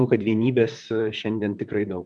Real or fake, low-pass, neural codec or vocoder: fake; 10.8 kHz; vocoder, 44.1 kHz, 128 mel bands, Pupu-Vocoder